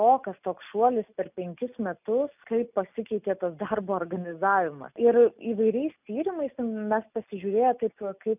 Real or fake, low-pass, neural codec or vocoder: real; 3.6 kHz; none